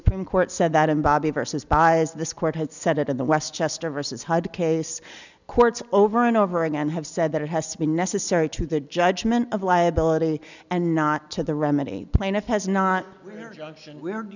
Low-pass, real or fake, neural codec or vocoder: 7.2 kHz; real; none